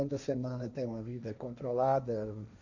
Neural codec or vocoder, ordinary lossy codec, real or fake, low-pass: codec, 16 kHz, 1.1 kbps, Voila-Tokenizer; none; fake; 7.2 kHz